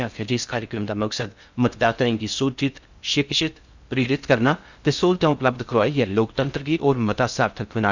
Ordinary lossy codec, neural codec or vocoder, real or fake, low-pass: Opus, 64 kbps; codec, 16 kHz in and 24 kHz out, 0.6 kbps, FocalCodec, streaming, 2048 codes; fake; 7.2 kHz